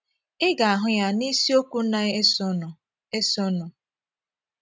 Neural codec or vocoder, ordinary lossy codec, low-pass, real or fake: none; none; none; real